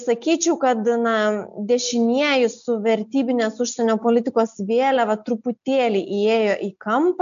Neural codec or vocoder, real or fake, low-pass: none; real; 7.2 kHz